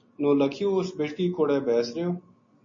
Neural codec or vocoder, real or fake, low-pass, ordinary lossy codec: none; real; 7.2 kHz; MP3, 32 kbps